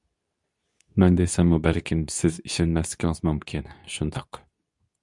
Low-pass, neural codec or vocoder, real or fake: 10.8 kHz; codec, 24 kHz, 0.9 kbps, WavTokenizer, medium speech release version 2; fake